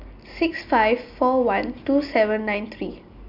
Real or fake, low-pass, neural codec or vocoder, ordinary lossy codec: real; 5.4 kHz; none; MP3, 48 kbps